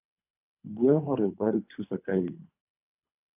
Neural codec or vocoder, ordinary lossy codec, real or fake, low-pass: codec, 24 kHz, 3 kbps, HILCodec; AAC, 32 kbps; fake; 3.6 kHz